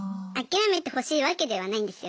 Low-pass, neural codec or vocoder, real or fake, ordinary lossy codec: none; none; real; none